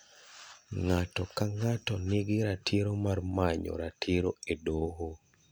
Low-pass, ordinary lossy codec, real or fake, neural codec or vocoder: none; none; real; none